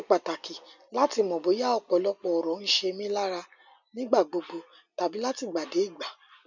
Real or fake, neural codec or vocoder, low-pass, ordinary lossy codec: real; none; 7.2 kHz; none